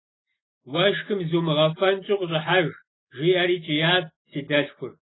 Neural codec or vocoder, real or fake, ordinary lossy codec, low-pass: none; real; AAC, 16 kbps; 7.2 kHz